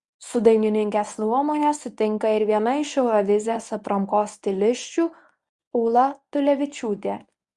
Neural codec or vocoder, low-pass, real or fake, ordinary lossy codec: codec, 24 kHz, 0.9 kbps, WavTokenizer, medium speech release version 1; 10.8 kHz; fake; Opus, 64 kbps